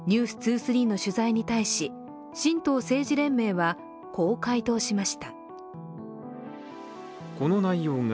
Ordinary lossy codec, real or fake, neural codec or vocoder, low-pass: none; real; none; none